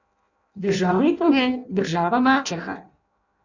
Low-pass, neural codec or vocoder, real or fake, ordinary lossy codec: 7.2 kHz; codec, 16 kHz in and 24 kHz out, 0.6 kbps, FireRedTTS-2 codec; fake; none